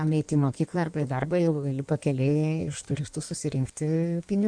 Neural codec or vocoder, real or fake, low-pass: codec, 16 kHz in and 24 kHz out, 1.1 kbps, FireRedTTS-2 codec; fake; 9.9 kHz